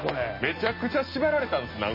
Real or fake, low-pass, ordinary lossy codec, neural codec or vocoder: real; 5.4 kHz; MP3, 24 kbps; none